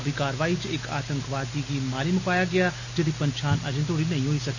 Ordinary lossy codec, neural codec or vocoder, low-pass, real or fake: none; none; 7.2 kHz; real